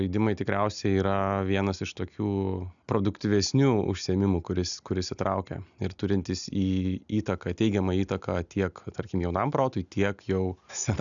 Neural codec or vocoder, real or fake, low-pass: none; real; 7.2 kHz